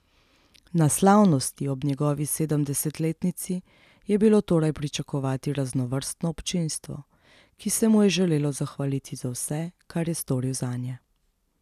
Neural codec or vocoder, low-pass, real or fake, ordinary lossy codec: none; 14.4 kHz; real; none